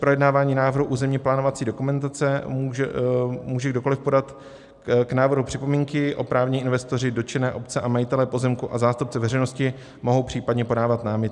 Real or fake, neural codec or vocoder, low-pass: real; none; 10.8 kHz